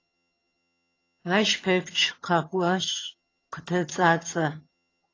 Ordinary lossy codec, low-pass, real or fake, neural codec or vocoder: AAC, 32 kbps; 7.2 kHz; fake; vocoder, 22.05 kHz, 80 mel bands, HiFi-GAN